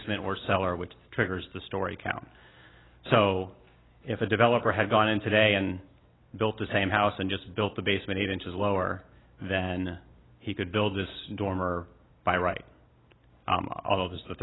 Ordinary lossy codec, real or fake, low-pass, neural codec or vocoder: AAC, 16 kbps; real; 7.2 kHz; none